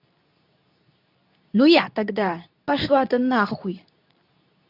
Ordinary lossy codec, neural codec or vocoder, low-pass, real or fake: none; codec, 24 kHz, 0.9 kbps, WavTokenizer, medium speech release version 2; 5.4 kHz; fake